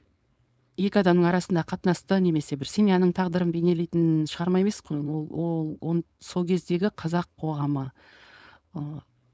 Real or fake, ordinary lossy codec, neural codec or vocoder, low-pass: fake; none; codec, 16 kHz, 4.8 kbps, FACodec; none